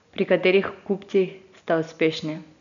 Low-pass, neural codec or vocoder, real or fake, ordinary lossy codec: 7.2 kHz; none; real; none